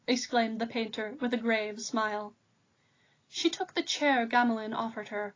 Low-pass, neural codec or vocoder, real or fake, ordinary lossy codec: 7.2 kHz; none; real; AAC, 32 kbps